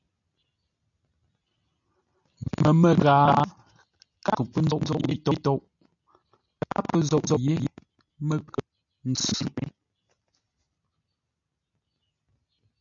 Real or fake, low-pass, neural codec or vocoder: real; 7.2 kHz; none